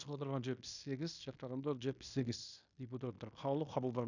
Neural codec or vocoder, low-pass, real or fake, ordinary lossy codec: codec, 16 kHz in and 24 kHz out, 0.9 kbps, LongCat-Audio-Codec, fine tuned four codebook decoder; 7.2 kHz; fake; none